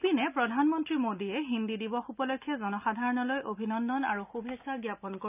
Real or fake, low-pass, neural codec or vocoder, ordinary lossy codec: real; 3.6 kHz; none; none